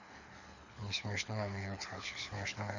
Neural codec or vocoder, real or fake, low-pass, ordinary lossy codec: codec, 24 kHz, 6 kbps, HILCodec; fake; 7.2 kHz; none